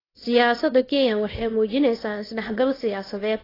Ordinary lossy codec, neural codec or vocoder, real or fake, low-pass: AAC, 24 kbps; codec, 24 kHz, 0.9 kbps, WavTokenizer, small release; fake; 5.4 kHz